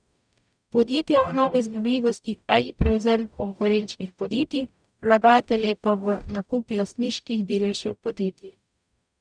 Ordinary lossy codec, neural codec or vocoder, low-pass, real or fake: none; codec, 44.1 kHz, 0.9 kbps, DAC; 9.9 kHz; fake